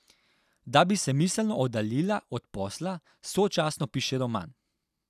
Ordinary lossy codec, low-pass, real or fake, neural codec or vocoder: none; 14.4 kHz; real; none